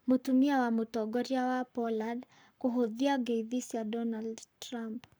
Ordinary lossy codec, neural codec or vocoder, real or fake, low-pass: none; codec, 44.1 kHz, 7.8 kbps, Pupu-Codec; fake; none